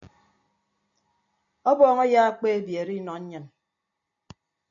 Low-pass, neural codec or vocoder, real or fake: 7.2 kHz; none; real